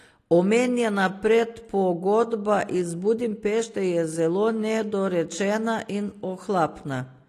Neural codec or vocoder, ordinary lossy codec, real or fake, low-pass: none; AAC, 48 kbps; real; 14.4 kHz